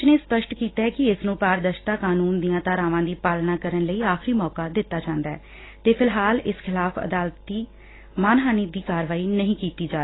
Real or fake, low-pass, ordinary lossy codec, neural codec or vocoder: real; 7.2 kHz; AAC, 16 kbps; none